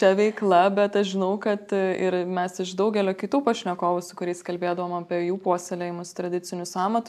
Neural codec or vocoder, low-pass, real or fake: none; 14.4 kHz; real